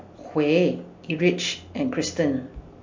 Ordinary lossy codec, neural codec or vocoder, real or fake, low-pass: MP3, 48 kbps; none; real; 7.2 kHz